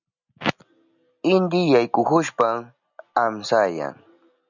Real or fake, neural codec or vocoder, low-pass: real; none; 7.2 kHz